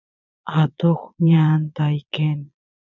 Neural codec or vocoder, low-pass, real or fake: vocoder, 22.05 kHz, 80 mel bands, Vocos; 7.2 kHz; fake